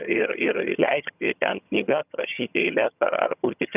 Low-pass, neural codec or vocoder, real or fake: 3.6 kHz; vocoder, 22.05 kHz, 80 mel bands, HiFi-GAN; fake